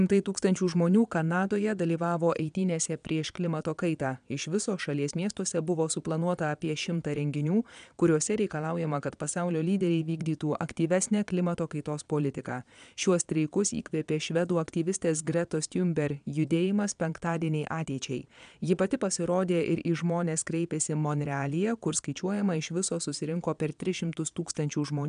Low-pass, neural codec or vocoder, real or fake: 9.9 kHz; vocoder, 22.05 kHz, 80 mel bands, Vocos; fake